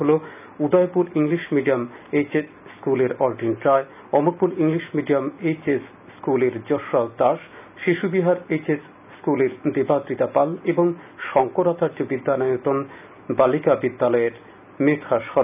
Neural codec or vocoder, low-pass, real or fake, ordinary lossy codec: none; 3.6 kHz; real; none